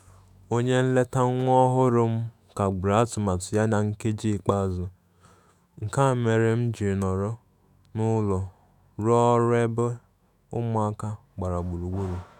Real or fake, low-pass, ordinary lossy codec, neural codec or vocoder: fake; 19.8 kHz; none; autoencoder, 48 kHz, 128 numbers a frame, DAC-VAE, trained on Japanese speech